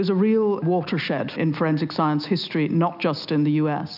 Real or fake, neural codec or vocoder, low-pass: real; none; 5.4 kHz